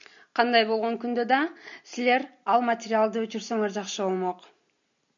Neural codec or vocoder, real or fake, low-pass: none; real; 7.2 kHz